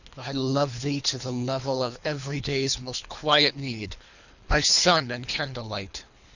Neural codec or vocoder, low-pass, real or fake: codec, 24 kHz, 3 kbps, HILCodec; 7.2 kHz; fake